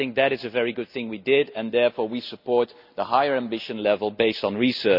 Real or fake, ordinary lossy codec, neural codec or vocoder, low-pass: real; none; none; 5.4 kHz